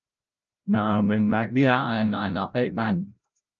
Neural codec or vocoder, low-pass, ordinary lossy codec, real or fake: codec, 16 kHz, 0.5 kbps, FreqCodec, larger model; 7.2 kHz; Opus, 24 kbps; fake